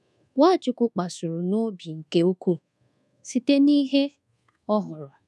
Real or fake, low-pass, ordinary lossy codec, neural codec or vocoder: fake; none; none; codec, 24 kHz, 1.2 kbps, DualCodec